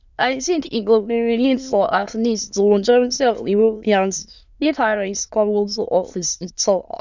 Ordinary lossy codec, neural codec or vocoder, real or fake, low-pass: none; autoencoder, 22.05 kHz, a latent of 192 numbers a frame, VITS, trained on many speakers; fake; 7.2 kHz